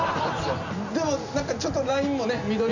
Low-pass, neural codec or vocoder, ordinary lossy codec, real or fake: 7.2 kHz; none; none; real